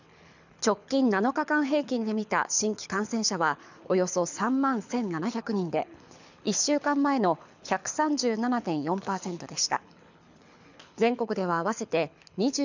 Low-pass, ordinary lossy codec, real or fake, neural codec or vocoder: 7.2 kHz; none; fake; codec, 24 kHz, 6 kbps, HILCodec